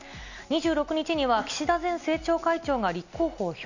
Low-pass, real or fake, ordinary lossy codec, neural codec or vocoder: 7.2 kHz; real; none; none